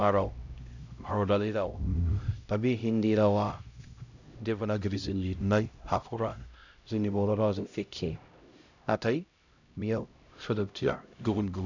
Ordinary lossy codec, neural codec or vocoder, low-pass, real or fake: none; codec, 16 kHz, 0.5 kbps, X-Codec, HuBERT features, trained on LibriSpeech; 7.2 kHz; fake